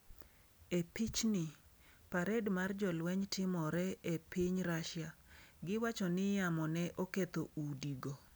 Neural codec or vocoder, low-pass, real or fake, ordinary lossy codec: none; none; real; none